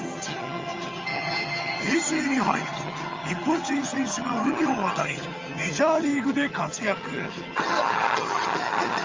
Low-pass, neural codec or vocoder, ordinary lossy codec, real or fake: 7.2 kHz; vocoder, 22.05 kHz, 80 mel bands, HiFi-GAN; Opus, 32 kbps; fake